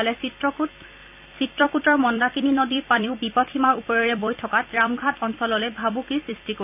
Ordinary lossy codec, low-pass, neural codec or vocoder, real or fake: none; 3.6 kHz; vocoder, 44.1 kHz, 128 mel bands every 256 samples, BigVGAN v2; fake